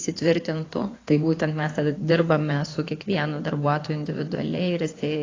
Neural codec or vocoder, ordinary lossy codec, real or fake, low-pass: codec, 16 kHz in and 24 kHz out, 2.2 kbps, FireRedTTS-2 codec; AAC, 32 kbps; fake; 7.2 kHz